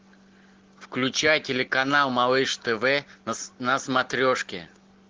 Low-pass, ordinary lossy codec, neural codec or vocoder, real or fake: 7.2 kHz; Opus, 16 kbps; none; real